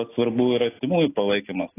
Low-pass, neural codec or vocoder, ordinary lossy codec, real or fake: 3.6 kHz; none; AAC, 16 kbps; real